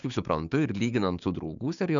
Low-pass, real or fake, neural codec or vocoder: 7.2 kHz; fake; codec, 16 kHz, 6 kbps, DAC